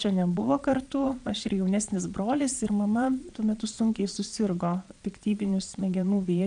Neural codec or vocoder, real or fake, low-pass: vocoder, 22.05 kHz, 80 mel bands, Vocos; fake; 9.9 kHz